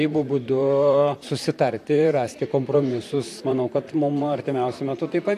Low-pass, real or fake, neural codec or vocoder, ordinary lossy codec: 14.4 kHz; fake; vocoder, 44.1 kHz, 128 mel bands, Pupu-Vocoder; AAC, 64 kbps